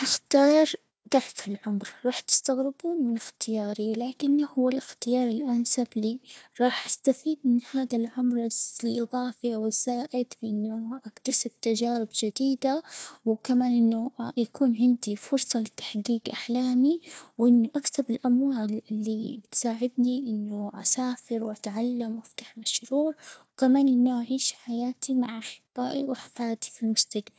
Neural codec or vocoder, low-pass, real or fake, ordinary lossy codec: codec, 16 kHz, 1 kbps, FunCodec, trained on Chinese and English, 50 frames a second; none; fake; none